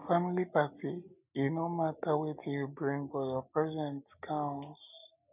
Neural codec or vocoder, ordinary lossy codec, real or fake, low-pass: none; none; real; 3.6 kHz